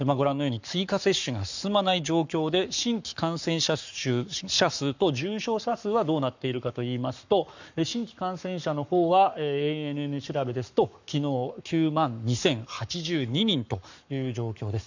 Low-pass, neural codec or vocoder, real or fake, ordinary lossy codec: 7.2 kHz; codec, 44.1 kHz, 7.8 kbps, Pupu-Codec; fake; none